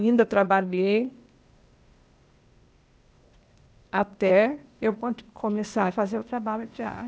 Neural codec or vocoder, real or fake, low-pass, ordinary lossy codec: codec, 16 kHz, 0.8 kbps, ZipCodec; fake; none; none